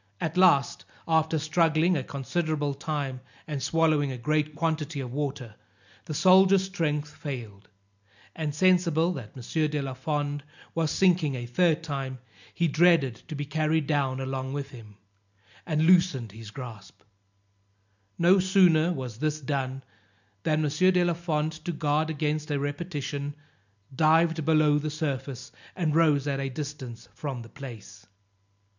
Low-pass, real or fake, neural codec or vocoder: 7.2 kHz; real; none